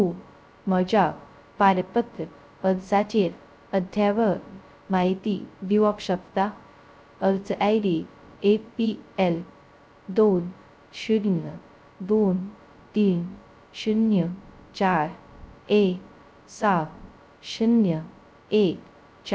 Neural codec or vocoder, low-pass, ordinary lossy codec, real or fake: codec, 16 kHz, 0.2 kbps, FocalCodec; none; none; fake